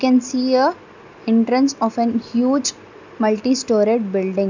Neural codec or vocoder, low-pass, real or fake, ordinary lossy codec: none; 7.2 kHz; real; none